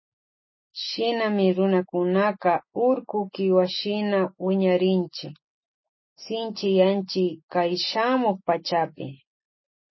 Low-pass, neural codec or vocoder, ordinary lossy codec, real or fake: 7.2 kHz; none; MP3, 24 kbps; real